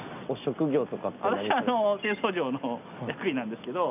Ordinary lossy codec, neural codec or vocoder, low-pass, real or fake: none; none; 3.6 kHz; real